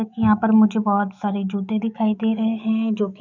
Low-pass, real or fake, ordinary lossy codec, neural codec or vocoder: 7.2 kHz; fake; none; autoencoder, 48 kHz, 128 numbers a frame, DAC-VAE, trained on Japanese speech